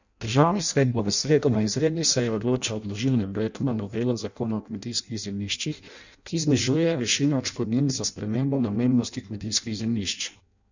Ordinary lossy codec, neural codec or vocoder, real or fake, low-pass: none; codec, 16 kHz in and 24 kHz out, 0.6 kbps, FireRedTTS-2 codec; fake; 7.2 kHz